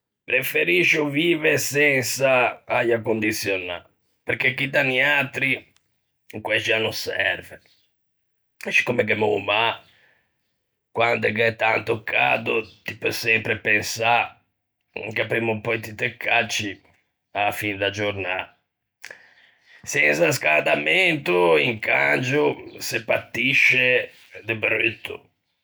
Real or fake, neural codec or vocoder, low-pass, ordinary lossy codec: real; none; none; none